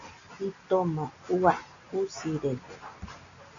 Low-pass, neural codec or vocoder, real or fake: 7.2 kHz; none; real